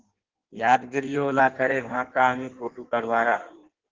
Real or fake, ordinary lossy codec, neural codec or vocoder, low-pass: fake; Opus, 16 kbps; codec, 16 kHz in and 24 kHz out, 1.1 kbps, FireRedTTS-2 codec; 7.2 kHz